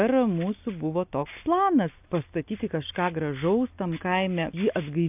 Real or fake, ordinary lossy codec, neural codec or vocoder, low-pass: real; AAC, 32 kbps; none; 3.6 kHz